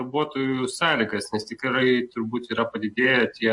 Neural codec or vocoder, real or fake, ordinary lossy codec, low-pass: none; real; MP3, 48 kbps; 10.8 kHz